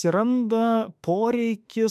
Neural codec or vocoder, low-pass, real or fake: autoencoder, 48 kHz, 32 numbers a frame, DAC-VAE, trained on Japanese speech; 14.4 kHz; fake